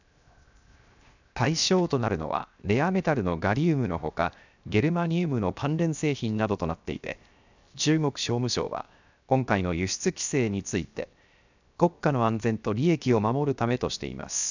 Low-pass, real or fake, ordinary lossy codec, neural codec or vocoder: 7.2 kHz; fake; none; codec, 16 kHz, 0.7 kbps, FocalCodec